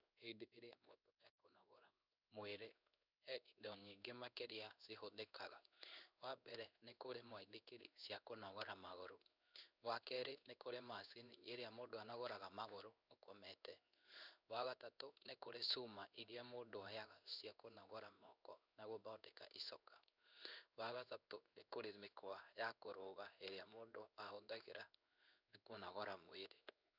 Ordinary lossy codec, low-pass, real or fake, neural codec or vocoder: none; 5.4 kHz; fake; codec, 16 kHz in and 24 kHz out, 1 kbps, XY-Tokenizer